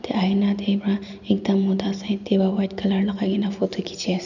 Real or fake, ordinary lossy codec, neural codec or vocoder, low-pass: real; none; none; 7.2 kHz